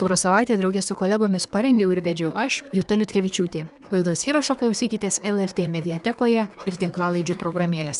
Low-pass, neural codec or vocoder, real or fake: 10.8 kHz; codec, 24 kHz, 1 kbps, SNAC; fake